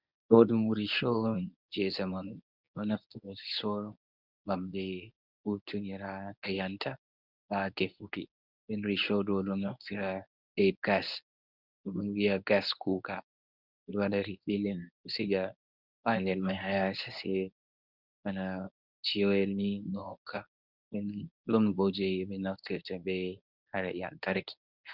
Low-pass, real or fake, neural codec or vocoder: 5.4 kHz; fake; codec, 24 kHz, 0.9 kbps, WavTokenizer, medium speech release version 1